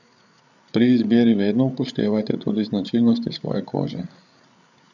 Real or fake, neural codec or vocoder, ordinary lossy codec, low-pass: fake; codec, 16 kHz, 8 kbps, FreqCodec, larger model; none; 7.2 kHz